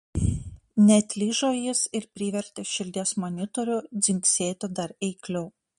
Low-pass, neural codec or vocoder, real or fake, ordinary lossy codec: 19.8 kHz; none; real; MP3, 48 kbps